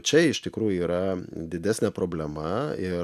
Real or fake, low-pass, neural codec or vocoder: real; 14.4 kHz; none